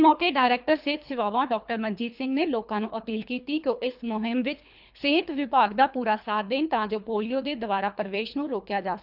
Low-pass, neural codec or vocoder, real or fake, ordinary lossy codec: 5.4 kHz; codec, 24 kHz, 3 kbps, HILCodec; fake; none